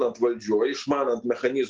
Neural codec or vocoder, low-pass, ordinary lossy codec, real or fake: none; 7.2 kHz; Opus, 16 kbps; real